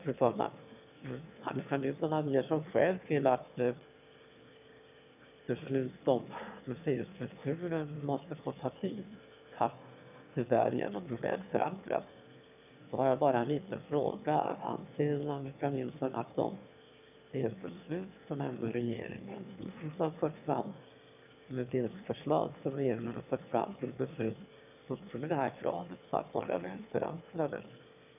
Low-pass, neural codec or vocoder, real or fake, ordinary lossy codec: 3.6 kHz; autoencoder, 22.05 kHz, a latent of 192 numbers a frame, VITS, trained on one speaker; fake; none